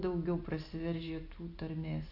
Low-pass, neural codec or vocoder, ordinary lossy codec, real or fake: 5.4 kHz; none; AAC, 48 kbps; real